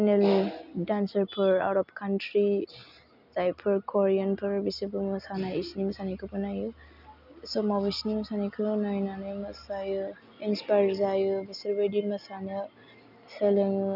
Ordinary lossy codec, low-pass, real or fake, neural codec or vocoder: none; 5.4 kHz; real; none